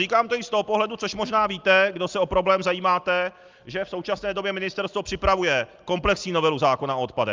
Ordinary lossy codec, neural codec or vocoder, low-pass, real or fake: Opus, 24 kbps; none; 7.2 kHz; real